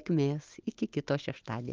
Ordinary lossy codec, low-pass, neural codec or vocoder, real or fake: Opus, 24 kbps; 7.2 kHz; none; real